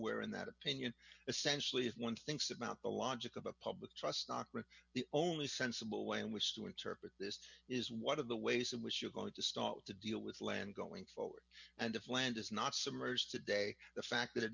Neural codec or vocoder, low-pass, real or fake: none; 7.2 kHz; real